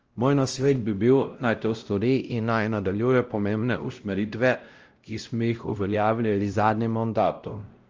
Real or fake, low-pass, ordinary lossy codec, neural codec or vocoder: fake; 7.2 kHz; Opus, 24 kbps; codec, 16 kHz, 0.5 kbps, X-Codec, WavLM features, trained on Multilingual LibriSpeech